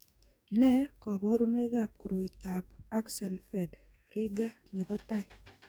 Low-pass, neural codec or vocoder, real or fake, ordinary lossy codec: none; codec, 44.1 kHz, 2.6 kbps, DAC; fake; none